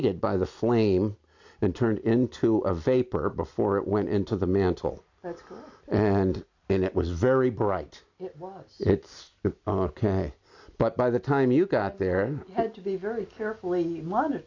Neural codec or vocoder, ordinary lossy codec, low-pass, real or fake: none; AAC, 48 kbps; 7.2 kHz; real